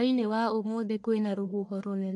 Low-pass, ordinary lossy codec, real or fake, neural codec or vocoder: 10.8 kHz; MP3, 64 kbps; fake; codec, 44.1 kHz, 1.7 kbps, Pupu-Codec